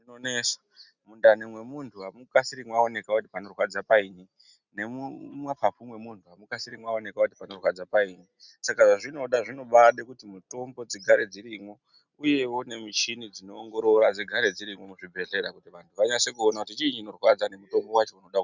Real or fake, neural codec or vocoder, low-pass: real; none; 7.2 kHz